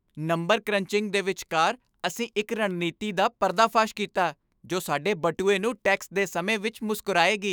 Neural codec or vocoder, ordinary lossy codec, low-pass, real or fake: autoencoder, 48 kHz, 128 numbers a frame, DAC-VAE, trained on Japanese speech; none; none; fake